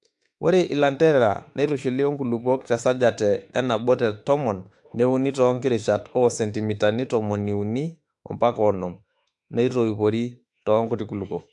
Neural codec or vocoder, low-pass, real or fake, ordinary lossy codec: autoencoder, 48 kHz, 32 numbers a frame, DAC-VAE, trained on Japanese speech; 10.8 kHz; fake; AAC, 64 kbps